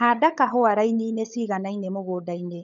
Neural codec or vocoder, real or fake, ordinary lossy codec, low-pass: codec, 16 kHz, 16 kbps, FunCodec, trained on LibriTTS, 50 frames a second; fake; none; 7.2 kHz